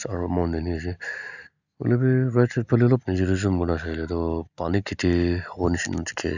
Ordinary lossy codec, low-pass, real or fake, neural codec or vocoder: none; 7.2 kHz; real; none